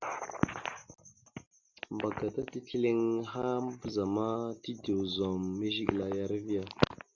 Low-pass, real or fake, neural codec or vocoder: 7.2 kHz; real; none